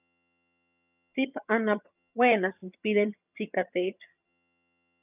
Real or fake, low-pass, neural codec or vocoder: fake; 3.6 kHz; vocoder, 22.05 kHz, 80 mel bands, HiFi-GAN